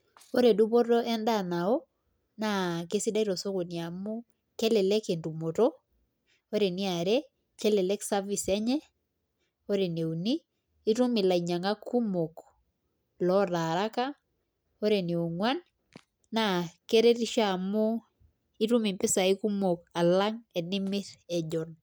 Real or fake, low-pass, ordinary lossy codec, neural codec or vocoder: real; none; none; none